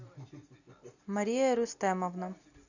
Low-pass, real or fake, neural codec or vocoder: 7.2 kHz; real; none